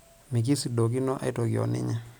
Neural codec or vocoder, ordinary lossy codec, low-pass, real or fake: none; none; none; real